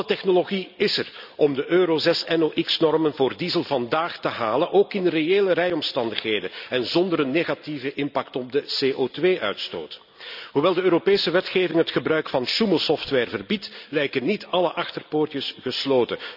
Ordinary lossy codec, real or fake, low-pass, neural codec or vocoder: none; real; 5.4 kHz; none